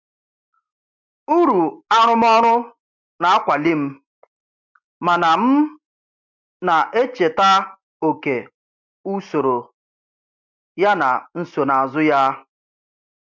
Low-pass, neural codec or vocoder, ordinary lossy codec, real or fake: 7.2 kHz; none; MP3, 64 kbps; real